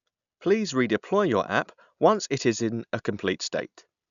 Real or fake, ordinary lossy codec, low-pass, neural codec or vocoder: real; none; 7.2 kHz; none